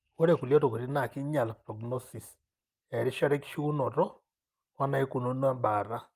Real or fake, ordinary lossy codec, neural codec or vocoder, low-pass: real; Opus, 32 kbps; none; 14.4 kHz